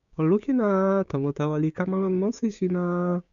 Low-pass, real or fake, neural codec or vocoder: 7.2 kHz; fake; codec, 16 kHz, 6 kbps, DAC